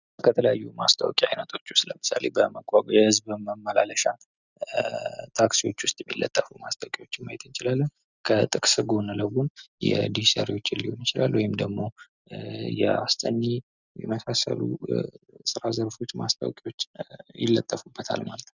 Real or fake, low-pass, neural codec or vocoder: real; 7.2 kHz; none